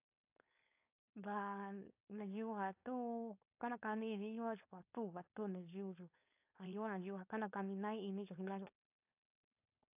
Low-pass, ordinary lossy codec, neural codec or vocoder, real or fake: 3.6 kHz; AAC, 32 kbps; codec, 16 kHz, 4.8 kbps, FACodec; fake